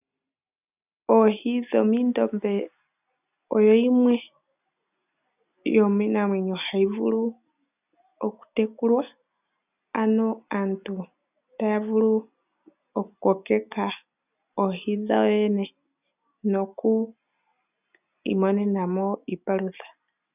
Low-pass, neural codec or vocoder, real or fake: 3.6 kHz; none; real